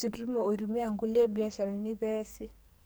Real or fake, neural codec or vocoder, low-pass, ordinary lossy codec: fake; codec, 44.1 kHz, 2.6 kbps, SNAC; none; none